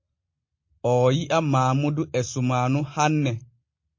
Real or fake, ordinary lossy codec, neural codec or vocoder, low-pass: real; MP3, 32 kbps; none; 7.2 kHz